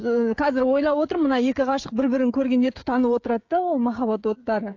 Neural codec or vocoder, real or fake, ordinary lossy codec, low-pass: codec, 16 kHz, 16 kbps, FreqCodec, smaller model; fake; AAC, 48 kbps; 7.2 kHz